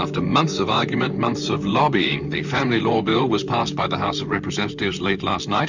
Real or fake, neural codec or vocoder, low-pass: fake; vocoder, 44.1 kHz, 128 mel bands, Pupu-Vocoder; 7.2 kHz